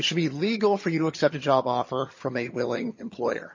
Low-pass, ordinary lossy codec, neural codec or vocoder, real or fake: 7.2 kHz; MP3, 32 kbps; vocoder, 22.05 kHz, 80 mel bands, HiFi-GAN; fake